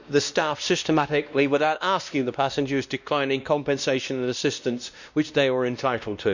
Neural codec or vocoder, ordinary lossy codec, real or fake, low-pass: codec, 16 kHz, 1 kbps, X-Codec, WavLM features, trained on Multilingual LibriSpeech; none; fake; 7.2 kHz